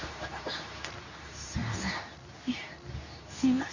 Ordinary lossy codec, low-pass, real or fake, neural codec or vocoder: none; 7.2 kHz; fake; codec, 24 kHz, 0.9 kbps, WavTokenizer, medium speech release version 2